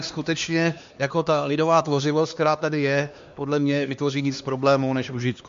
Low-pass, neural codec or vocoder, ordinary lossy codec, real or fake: 7.2 kHz; codec, 16 kHz, 2 kbps, X-Codec, HuBERT features, trained on LibriSpeech; MP3, 48 kbps; fake